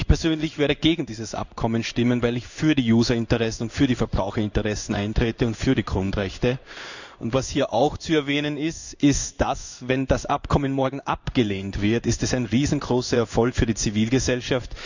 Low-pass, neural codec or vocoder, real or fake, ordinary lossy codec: 7.2 kHz; codec, 16 kHz in and 24 kHz out, 1 kbps, XY-Tokenizer; fake; none